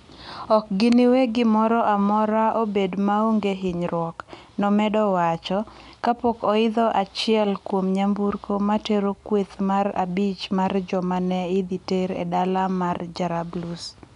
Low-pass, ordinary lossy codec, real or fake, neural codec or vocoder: 10.8 kHz; none; real; none